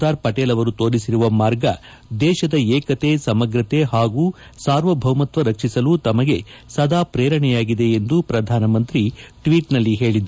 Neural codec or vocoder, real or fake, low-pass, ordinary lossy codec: none; real; none; none